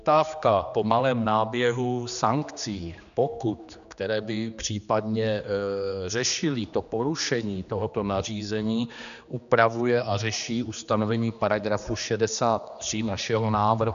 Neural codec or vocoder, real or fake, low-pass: codec, 16 kHz, 2 kbps, X-Codec, HuBERT features, trained on general audio; fake; 7.2 kHz